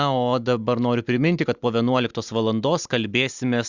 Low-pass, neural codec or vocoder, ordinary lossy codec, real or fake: 7.2 kHz; none; Opus, 64 kbps; real